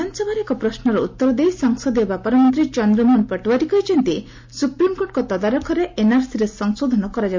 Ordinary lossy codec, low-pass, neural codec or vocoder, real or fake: MP3, 48 kbps; 7.2 kHz; none; real